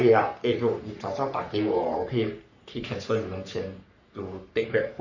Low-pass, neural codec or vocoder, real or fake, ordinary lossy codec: 7.2 kHz; codec, 44.1 kHz, 3.4 kbps, Pupu-Codec; fake; none